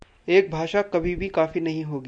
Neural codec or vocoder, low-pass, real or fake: none; 9.9 kHz; real